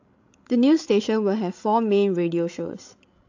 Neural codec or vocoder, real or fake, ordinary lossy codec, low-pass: codec, 16 kHz, 16 kbps, FreqCodec, larger model; fake; AAC, 48 kbps; 7.2 kHz